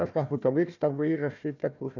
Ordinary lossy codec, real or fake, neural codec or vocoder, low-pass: none; fake; codec, 16 kHz, 1 kbps, FunCodec, trained on Chinese and English, 50 frames a second; 7.2 kHz